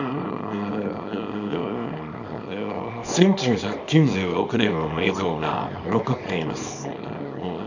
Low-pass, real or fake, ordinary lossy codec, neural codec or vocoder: 7.2 kHz; fake; none; codec, 24 kHz, 0.9 kbps, WavTokenizer, small release